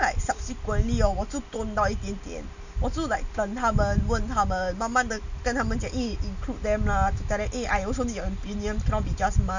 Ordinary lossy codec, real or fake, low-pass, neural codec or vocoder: none; real; 7.2 kHz; none